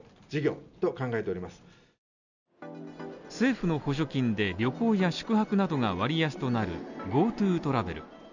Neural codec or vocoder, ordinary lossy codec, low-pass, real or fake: none; none; 7.2 kHz; real